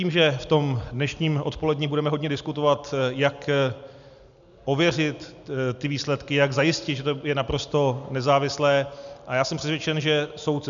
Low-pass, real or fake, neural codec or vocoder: 7.2 kHz; real; none